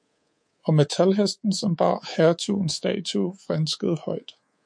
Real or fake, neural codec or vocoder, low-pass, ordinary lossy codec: fake; codec, 24 kHz, 3.1 kbps, DualCodec; 9.9 kHz; MP3, 48 kbps